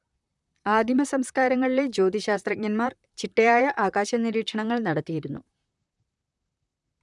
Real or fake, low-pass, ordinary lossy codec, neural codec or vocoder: fake; 10.8 kHz; none; vocoder, 44.1 kHz, 128 mel bands, Pupu-Vocoder